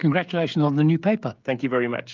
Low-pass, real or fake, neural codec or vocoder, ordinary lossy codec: 7.2 kHz; fake; codec, 16 kHz, 16 kbps, FreqCodec, smaller model; Opus, 32 kbps